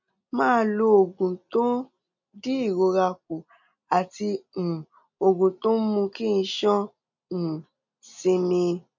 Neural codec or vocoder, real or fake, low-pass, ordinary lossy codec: none; real; 7.2 kHz; MP3, 64 kbps